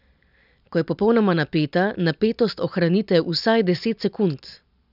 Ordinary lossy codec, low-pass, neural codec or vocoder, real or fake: none; 5.4 kHz; none; real